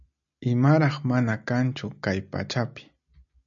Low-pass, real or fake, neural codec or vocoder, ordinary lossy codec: 7.2 kHz; real; none; MP3, 96 kbps